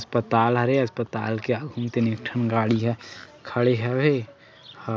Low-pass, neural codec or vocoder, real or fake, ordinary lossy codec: none; none; real; none